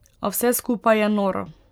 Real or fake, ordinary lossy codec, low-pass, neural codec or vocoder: fake; none; none; vocoder, 44.1 kHz, 128 mel bands every 512 samples, BigVGAN v2